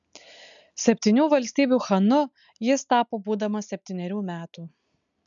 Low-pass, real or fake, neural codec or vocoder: 7.2 kHz; real; none